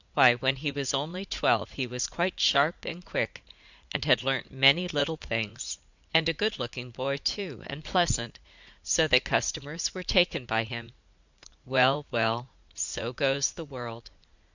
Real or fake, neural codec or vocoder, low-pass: fake; vocoder, 44.1 kHz, 80 mel bands, Vocos; 7.2 kHz